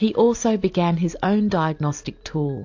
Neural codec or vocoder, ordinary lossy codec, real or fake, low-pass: none; AAC, 48 kbps; real; 7.2 kHz